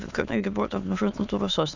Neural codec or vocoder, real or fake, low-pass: autoencoder, 22.05 kHz, a latent of 192 numbers a frame, VITS, trained on many speakers; fake; 7.2 kHz